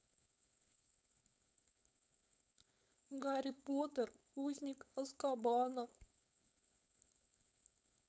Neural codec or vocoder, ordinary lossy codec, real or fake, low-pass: codec, 16 kHz, 4.8 kbps, FACodec; none; fake; none